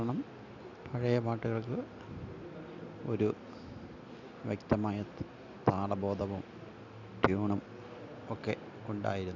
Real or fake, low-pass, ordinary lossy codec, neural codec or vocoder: real; 7.2 kHz; none; none